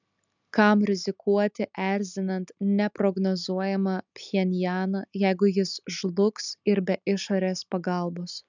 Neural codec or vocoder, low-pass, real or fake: none; 7.2 kHz; real